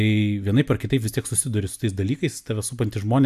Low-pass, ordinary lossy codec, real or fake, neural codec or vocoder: 14.4 kHz; AAC, 96 kbps; real; none